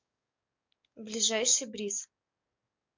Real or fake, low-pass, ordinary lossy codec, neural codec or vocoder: fake; 7.2 kHz; MP3, 64 kbps; codec, 44.1 kHz, 7.8 kbps, DAC